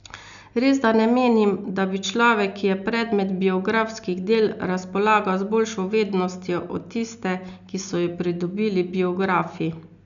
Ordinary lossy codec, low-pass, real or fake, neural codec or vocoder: none; 7.2 kHz; real; none